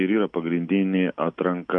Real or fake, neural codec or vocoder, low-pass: real; none; 9.9 kHz